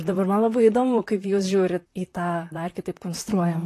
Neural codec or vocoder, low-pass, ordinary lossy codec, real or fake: vocoder, 44.1 kHz, 128 mel bands, Pupu-Vocoder; 14.4 kHz; AAC, 48 kbps; fake